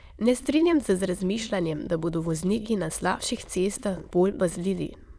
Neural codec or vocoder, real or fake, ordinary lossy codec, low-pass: autoencoder, 22.05 kHz, a latent of 192 numbers a frame, VITS, trained on many speakers; fake; none; none